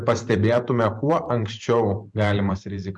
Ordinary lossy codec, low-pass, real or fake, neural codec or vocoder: MP3, 64 kbps; 10.8 kHz; fake; vocoder, 44.1 kHz, 128 mel bands every 512 samples, BigVGAN v2